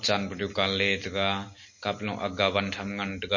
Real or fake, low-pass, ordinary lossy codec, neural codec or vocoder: real; 7.2 kHz; MP3, 32 kbps; none